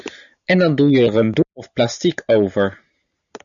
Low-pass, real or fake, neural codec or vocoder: 7.2 kHz; real; none